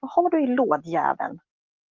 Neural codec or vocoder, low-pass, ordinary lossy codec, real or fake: none; 7.2 kHz; Opus, 32 kbps; real